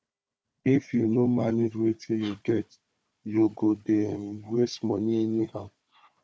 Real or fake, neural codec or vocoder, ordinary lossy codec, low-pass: fake; codec, 16 kHz, 4 kbps, FunCodec, trained on Chinese and English, 50 frames a second; none; none